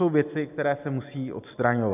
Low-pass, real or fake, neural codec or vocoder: 3.6 kHz; real; none